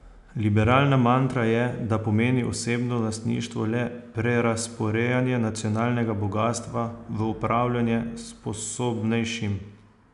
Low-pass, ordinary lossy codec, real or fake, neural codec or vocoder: 10.8 kHz; none; real; none